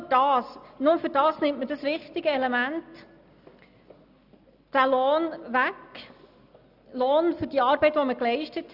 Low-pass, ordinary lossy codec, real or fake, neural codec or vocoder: 5.4 kHz; none; real; none